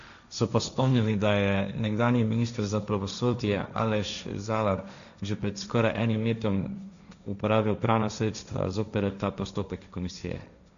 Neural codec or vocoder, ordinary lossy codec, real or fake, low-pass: codec, 16 kHz, 1.1 kbps, Voila-Tokenizer; none; fake; 7.2 kHz